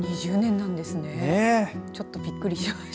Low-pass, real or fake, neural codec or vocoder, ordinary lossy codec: none; real; none; none